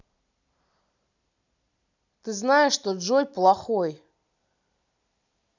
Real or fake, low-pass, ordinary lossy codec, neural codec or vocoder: real; 7.2 kHz; none; none